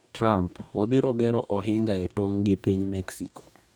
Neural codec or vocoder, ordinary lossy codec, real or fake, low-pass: codec, 44.1 kHz, 2.6 kbps, DAC; none; fake; none